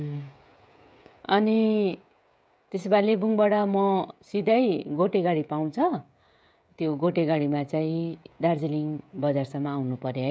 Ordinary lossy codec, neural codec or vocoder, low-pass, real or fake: none; codec, 16 kHz, 16 kbps, FreqCodec, smaller model; none; fake